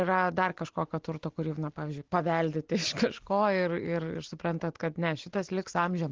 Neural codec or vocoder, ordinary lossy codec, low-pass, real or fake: none; Opus, 16 kbps; 7.2 kHz; real